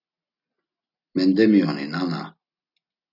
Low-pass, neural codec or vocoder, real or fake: 5.4 kHz; none; real